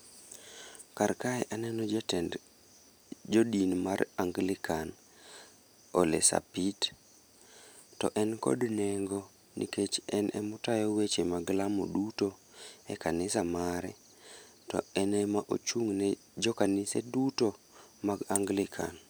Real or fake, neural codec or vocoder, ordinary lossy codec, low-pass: real; none; none; none